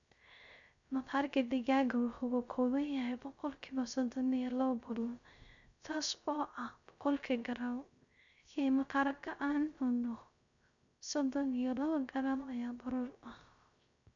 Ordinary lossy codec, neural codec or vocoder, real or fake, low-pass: none; codec, 16 kHz, 0.3 kbps, FocalCodec; fake; 7.2 kHz